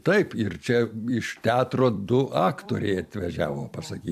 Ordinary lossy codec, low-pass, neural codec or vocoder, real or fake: AAC, 96 kbps; 14.4 kHz; vocoder, 44.1 kHz, 128 mel bands every 256 samples, BigVGAN v2; fake